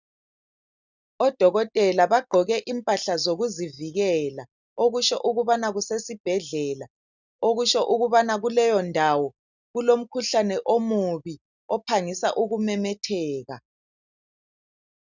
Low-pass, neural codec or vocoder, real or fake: 7.2 kHz; none; real